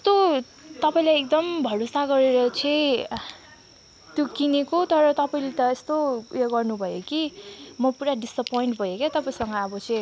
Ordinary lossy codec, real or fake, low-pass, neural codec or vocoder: none; real; none; none